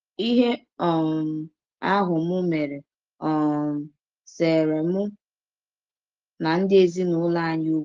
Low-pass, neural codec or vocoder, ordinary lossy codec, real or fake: 7.2 kHz; none; Opus, 16 kbps; real